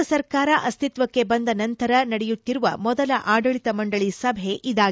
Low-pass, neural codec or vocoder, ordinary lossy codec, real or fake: none; none; none; real